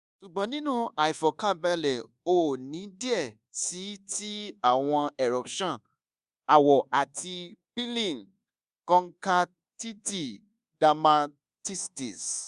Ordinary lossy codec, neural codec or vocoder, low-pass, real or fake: Opus, 64 kbps; codec, 24 kHz, 1.2 kbps, DualCodec; 10.8 kHz; fake